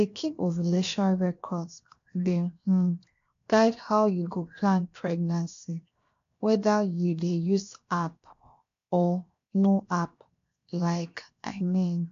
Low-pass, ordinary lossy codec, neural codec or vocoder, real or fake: 7.2 kHz; AAC, 48 kbps; codec, 16 kHz, 0.7 kbps, FocalCodec; fake